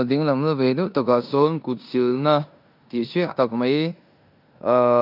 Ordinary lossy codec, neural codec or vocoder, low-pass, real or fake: none; codec, 16 kHz in and 24 kHz out, 0.9 kbps, LongCat-Audio-Codec, four codebook decoder; 5.4 kHz; fake